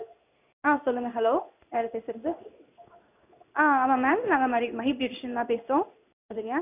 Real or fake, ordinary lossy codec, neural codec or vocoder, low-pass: fake; none; codec, 16 kHz in and 24 kHz out, 1 kbps, XY-Tokenizer; 3.6 kHz